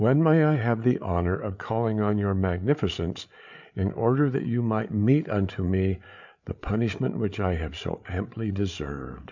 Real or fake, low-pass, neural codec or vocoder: fake; 7.2 kHz; codec, 16 kHz, 8 kbps, FreqCodec, larger model